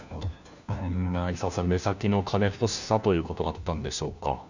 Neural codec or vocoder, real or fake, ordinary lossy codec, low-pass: codec, 16 kHz, 1 kbps, FunCodec, trained on LibriTTS, 50 frames a second; fake; none; 7.2 kHz